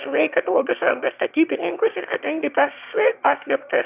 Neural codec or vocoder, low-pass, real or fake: autoencoder, 22.05 kHz, a latent of 192 numbers a frame, VITS, trained on one speaker; 3.6 kHz; fake